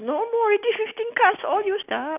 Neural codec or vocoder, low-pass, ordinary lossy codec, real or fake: none; 3.6 kHz; none; real